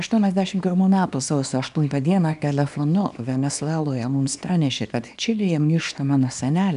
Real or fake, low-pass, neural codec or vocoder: fake; 10.8 kHz; codec, 24 kHz, 0.9 kbps, WavTokenizer, small release